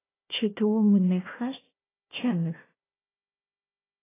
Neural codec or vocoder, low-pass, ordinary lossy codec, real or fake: codec, 16 kHz, 1 kbps, FunCodec, trained on Chinese and English, 50 frames a second; 3.6 kHz; AAC, 16 kbps; fake